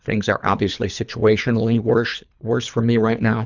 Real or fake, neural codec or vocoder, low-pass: fake; codec, 24 kHz, 3 kbps, HILCodec; 7.2 kHz